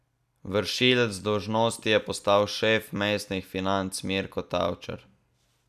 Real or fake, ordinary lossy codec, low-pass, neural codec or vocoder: real; none; 14.4 kHz; none